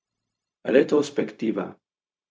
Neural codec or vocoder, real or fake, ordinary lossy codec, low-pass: codec, 16 kHz, 0.4 kbps, LongCat-Audio-Codec; fake; none; none